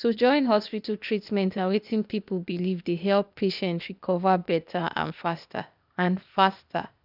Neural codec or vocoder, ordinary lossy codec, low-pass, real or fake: codec, 16 kHz, 0.8 kbps, ZipCodec; none; 5.4 kHz; fake